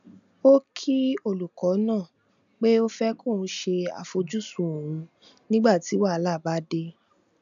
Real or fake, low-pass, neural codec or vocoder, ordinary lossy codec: real; 7.2 kHz; none; none